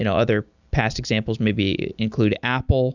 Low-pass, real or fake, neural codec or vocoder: 7.2 kHz; real; none